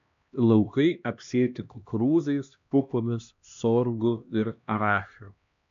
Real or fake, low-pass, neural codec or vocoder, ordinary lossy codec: fake; 7.2 kHz; codec, 16 kHz, 1 kbps, X-Codec, HuBERT features, trained on LibriSpeech; MP3, 64 kbps